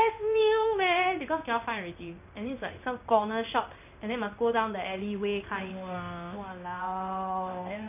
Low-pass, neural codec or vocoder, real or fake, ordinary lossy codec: 3.6 kHz; codec, 16 kHz in and 24 kHz out, 1 kbps, XY-Tokenizer; fake; none